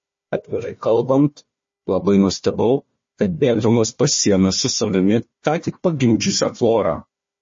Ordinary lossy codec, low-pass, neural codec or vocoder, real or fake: MP3, 32 kbps; 7.2 kHz; codec, 16 kHz, 1 kbps, FunCodec, trained on Chinese and English, 50 frames a second; fake